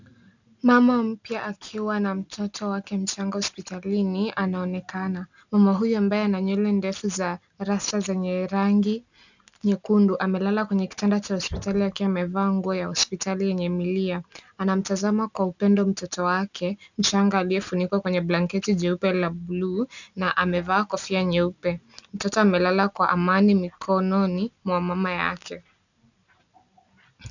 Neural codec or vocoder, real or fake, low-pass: none; real; 7.2 kHz